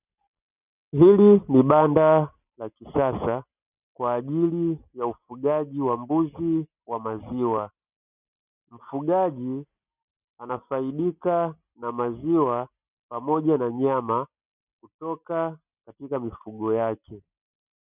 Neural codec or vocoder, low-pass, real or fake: none; 3.6 kHz; real